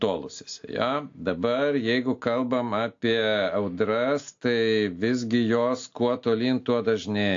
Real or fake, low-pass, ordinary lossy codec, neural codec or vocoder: real; 7.2 kHz; AAC, 48 kbps; none